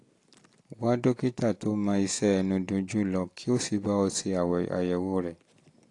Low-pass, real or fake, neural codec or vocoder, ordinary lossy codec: 10.8 kHz; real; none; AAC, 48 kbps